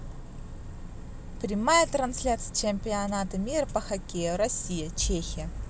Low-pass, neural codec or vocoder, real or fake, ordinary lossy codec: none; none; real; none